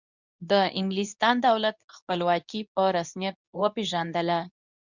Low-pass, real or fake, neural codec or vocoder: 7.2 kHz; fake; codec, 24 kHz, 0.9 kbps, WavTokenizer, medium speech release version 2